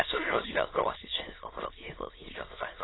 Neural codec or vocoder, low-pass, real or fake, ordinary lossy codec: autoencoder, 22.05 kHz, a latent of 192 numbers a frame, VITS, trained on many speakers; 7.2 kHz; fake; AAC, 16 kbps